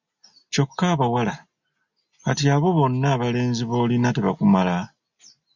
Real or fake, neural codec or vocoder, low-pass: real; none; 7.2 kHz